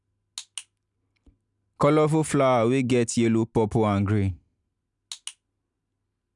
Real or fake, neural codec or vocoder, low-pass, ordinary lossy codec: real; none; 10.8 kHz; none